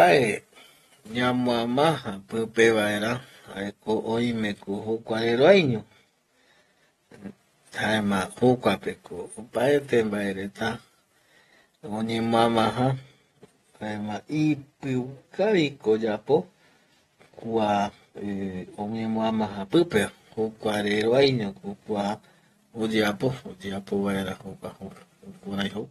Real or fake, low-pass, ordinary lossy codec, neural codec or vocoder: real; 19.8 kHz; AAC, 32 kbps; none